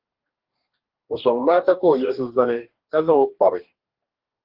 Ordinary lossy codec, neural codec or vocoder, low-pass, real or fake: Opus, 16 kbps; codec, 44.1 kHz, 2.6 kbps, DAC; 5.4 kHz; fake